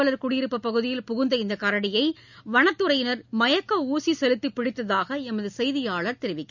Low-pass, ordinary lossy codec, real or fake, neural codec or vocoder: 7.2 kHz; none; real; none